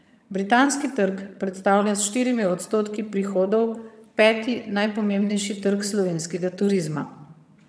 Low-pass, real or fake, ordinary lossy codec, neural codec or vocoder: none; fake; none; vocoder, 22.05 kHz, 80 mel bands, HiFi-GAN